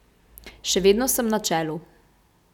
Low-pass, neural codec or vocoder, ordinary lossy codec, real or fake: 19.8 kHz; none; none; real